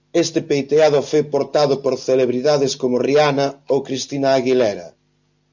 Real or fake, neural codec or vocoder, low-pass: real; none; 7.2 kHz